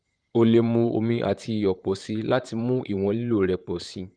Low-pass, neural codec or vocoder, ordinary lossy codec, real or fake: 9.9 kHz; vocoder, 44.1 kHz, 128 mel bands, Pupu-Vocoder; Opus, 32 kbps; fake